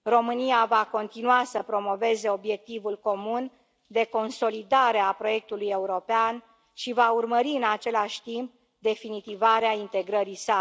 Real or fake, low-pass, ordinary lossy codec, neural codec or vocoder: real; none; none; none